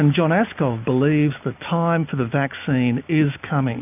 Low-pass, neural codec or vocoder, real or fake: 3.6 kHz; none; real